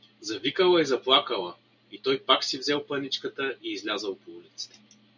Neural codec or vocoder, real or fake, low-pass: none; real; 7.2 kHz